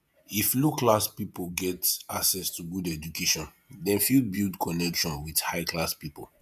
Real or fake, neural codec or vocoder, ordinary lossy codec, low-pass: fake; vocoder, 48 kHz, 128 mel bands, Vocos; none; 14.4 kHz